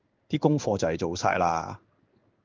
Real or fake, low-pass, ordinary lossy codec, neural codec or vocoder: fake; 7.2 kHz; Opus, 24 kbps; codec, 16 kHz in and 24 kHz out, 1 kbps, XY-Tokenizer